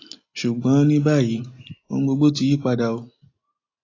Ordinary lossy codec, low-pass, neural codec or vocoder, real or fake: AAC, 32 kbps; 7.2 kHz; none; real